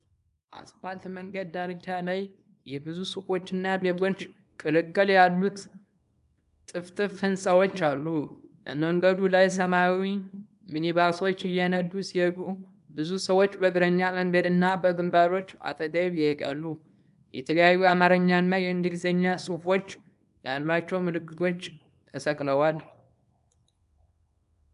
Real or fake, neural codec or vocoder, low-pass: fake; codec, 24 kHz, 0.9 kbps, WavTokenizer, small release; 10.8 kHz